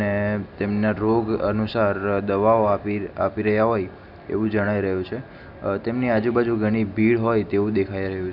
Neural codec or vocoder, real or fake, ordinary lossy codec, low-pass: none; real; none; 5.4 kHz